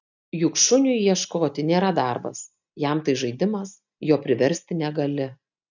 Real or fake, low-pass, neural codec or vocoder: real; 7.2 kHz; none